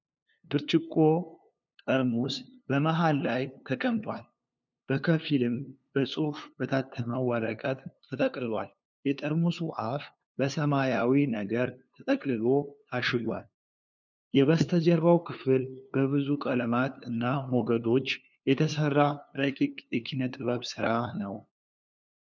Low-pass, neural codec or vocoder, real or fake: 7.2 kHz; codec, 16 kHz, 2 kbps, FunCodec, trained on LibriTTS, 25 frames a second; fake